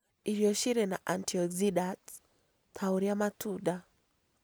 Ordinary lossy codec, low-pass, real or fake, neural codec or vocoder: none; none; real; none